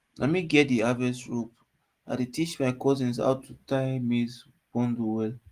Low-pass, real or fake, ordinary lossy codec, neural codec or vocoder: 14.4 kHz; real; Opus, 24 kbps; none